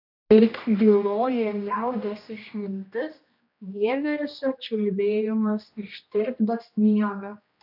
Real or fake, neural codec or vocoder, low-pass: fake; codec, 16 kHz, 1 kbps, X-Codec, HuBERT features, trained on general audio; 5.4 kHz